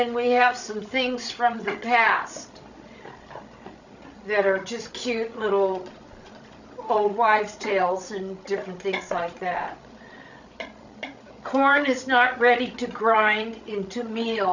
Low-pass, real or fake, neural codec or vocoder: 7.2 kHz; fake; codec, 16 kHz, 16 kbps, FreqCodec, larger model